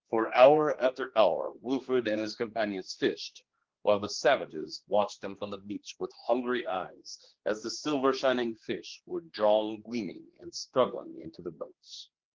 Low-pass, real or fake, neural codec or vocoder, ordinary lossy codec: 7.2 kHz; fake; codec, 16 kHz, 2 kbps, X-Codec, HuBERT features, trained on general audio; Opus, 16 kbps